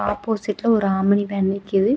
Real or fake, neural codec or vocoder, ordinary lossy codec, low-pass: real; none; none; none